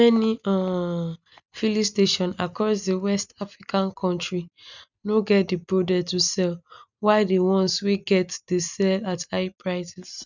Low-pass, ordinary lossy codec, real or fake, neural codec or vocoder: 7.2 kHz; none; real; none